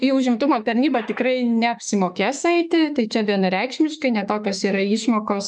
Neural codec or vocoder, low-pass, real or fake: autoencoder, 48 kHz, 32 numbers a frame, DAC-VAE, trained on Japanese speech; 10.8 kHz; fake